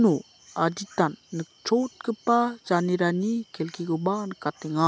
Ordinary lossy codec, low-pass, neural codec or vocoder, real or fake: none; none; none; real